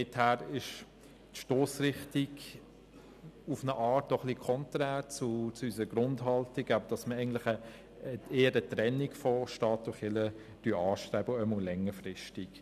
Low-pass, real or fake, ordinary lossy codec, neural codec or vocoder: 14.4 kHz; real; none; none